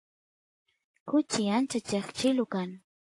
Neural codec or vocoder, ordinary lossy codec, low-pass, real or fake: vocoder, 44.1 kHz, 128 mel bands, Pupu-Vocoder; AAC, 48 kbps; 10.8 kHz; fake